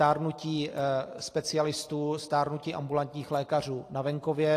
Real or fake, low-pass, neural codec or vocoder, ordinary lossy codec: real; 14.4 kHz; none; AAC, 48 kbps